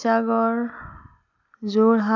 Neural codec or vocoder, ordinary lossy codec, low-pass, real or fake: none; none; 7.2 kHz; real